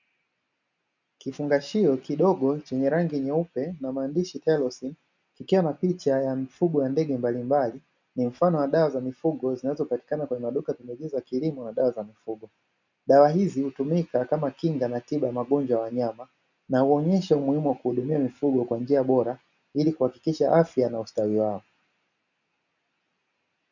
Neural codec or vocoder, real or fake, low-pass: none; real; 7.2 kHz